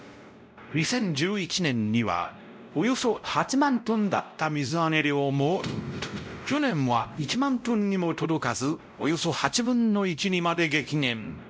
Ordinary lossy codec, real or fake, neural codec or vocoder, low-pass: none; fake; codec, 16 kHz, 0.5 kbps, X-Codec, WavLM features, trained on Multilingual LibriSpeech; none